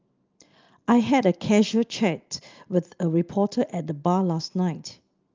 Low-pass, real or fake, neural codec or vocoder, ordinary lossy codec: 7.2 kHz; real; none; Opus, 24 kbps